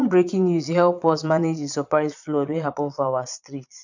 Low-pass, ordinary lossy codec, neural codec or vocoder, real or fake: 7.2 kHz; none; vocoder, 22.05 kHz, 80 mel bands, Vocos; fake